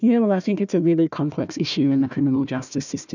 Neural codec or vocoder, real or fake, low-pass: codec, 16 kHz, 1 kbps, FunCodec, trained on Chinese and English, 50 frames a second; fake; 7.2 kHz